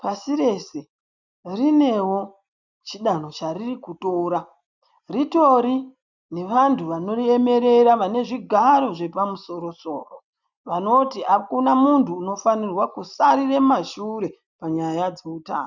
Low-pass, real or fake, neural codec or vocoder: 7.2 kHz; real; none